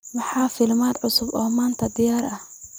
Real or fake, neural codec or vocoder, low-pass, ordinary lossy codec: fake; vocoder, 44.1 kHz, 128 mel bands every 256 samples, BigVGAN v2; none; none